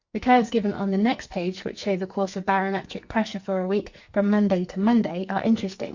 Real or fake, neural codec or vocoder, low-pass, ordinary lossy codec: fake; codec, 44.1 kHz, 2.6 kbps, SNAC; 7.2 kHz; Opus, 64 kbps